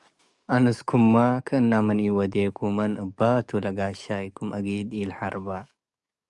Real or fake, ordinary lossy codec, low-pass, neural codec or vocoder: fake; Opus, 64 kbps; 10.8 kHz; codec, 44.1 kHz, 7.8 kbps, DAC